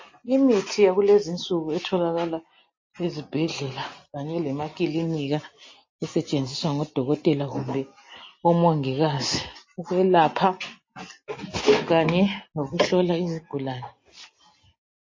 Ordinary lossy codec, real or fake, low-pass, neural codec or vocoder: MP3, 32 kbps; real; 7.2 kHz; none